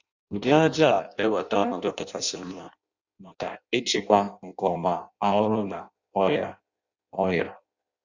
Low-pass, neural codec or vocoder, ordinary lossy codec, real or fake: 7.2 kHz; codec, 16 kHz in and 24 kHz out, 0.6 kbps, FireRedTTS-2 codec; Opus, 64 kbps; fake